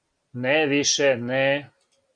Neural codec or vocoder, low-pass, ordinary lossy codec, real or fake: none; 9.9 kHz; Opus, 64 kbps; real